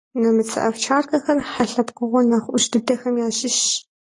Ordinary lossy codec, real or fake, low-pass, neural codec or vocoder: AAC, 32 kbps; real; 10.8 kHz; none